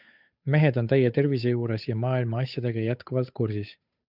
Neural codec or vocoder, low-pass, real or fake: codec, 16 kHz, 8 kbps, FunCodec, trained on Chinese and English, 25 frames a second; 5.4 kHz; fake